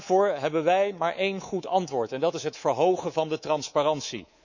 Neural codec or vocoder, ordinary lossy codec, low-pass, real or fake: codec, 24 kHz, 3.1 kbps, DualCodec; none; 7.2 kHz; fake